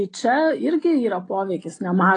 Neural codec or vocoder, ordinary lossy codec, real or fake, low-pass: none; AAC, 32 kbps; real; 9.9 kHz